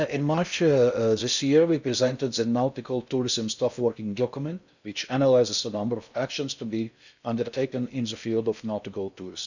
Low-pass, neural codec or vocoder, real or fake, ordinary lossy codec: 7.2 kHz; codec, 16 kHz in and 24 kHz out, 0.6 kbps, FocalCodec, streaming, 4096 codes; fake; none